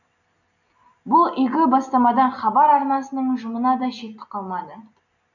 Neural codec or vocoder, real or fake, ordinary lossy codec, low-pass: none; real; none; 7.2 kHz